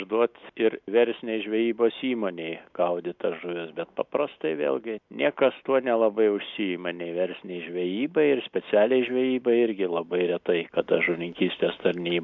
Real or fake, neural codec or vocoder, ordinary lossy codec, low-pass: real; none; MP3, 64 kbps; 7.2 kHz